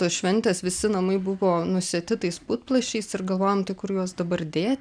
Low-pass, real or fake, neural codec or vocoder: 9.9 kHz; real; none